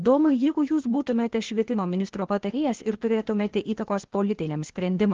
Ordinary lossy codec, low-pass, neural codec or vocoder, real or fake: Opus, 16 kbps; 7.2 kHz; codec, 16 kHz, 0.8 kbps, ZipCodec; fake